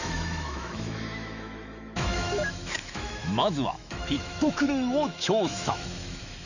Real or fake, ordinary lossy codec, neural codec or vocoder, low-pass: fake; none; autoencoder, 48 kHz, 128 numbers a frame, DAC-VAE, trained on Japanese speech; 7.2 kHz